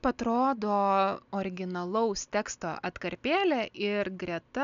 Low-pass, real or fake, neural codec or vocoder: 7.2 kHz; real; none